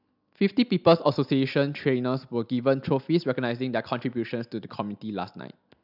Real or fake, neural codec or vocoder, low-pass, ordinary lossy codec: real; none; 5.4 kHz; none